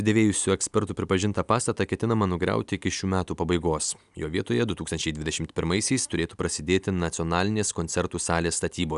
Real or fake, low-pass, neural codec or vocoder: real; 10.8 kHz; none